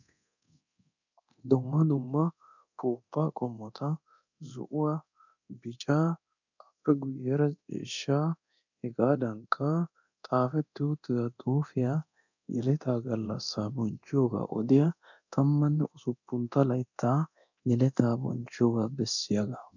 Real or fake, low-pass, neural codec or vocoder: fake; 7.2 kHz; codec, 24 kHz, 0.9 kbps, DualCodec